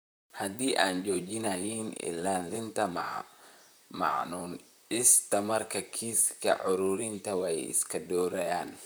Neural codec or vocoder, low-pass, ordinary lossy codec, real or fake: vocoder, 44.1 kHz, 128 mel bands, Pupu-Vocoder; none; none; fake